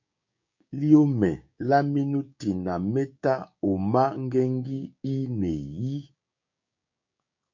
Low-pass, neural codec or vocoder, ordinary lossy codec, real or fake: 7.2 kHz; codec, 16 kHz, 6 kbps, DAC; MP3, 48 kbps; fake